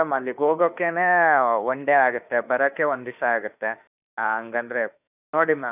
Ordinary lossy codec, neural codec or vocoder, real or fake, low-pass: none; autoencoder, 48 kHz, 32 numbers a frame, DAC-VAE, trained on Japanese speech; fake; 3.6 kHz